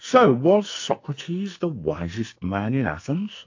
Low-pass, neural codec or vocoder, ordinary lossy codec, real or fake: 7.2 kHz; codec, 44.1 kHz, 2.6 kbps, SNAC; AAC, 48 kbps; fake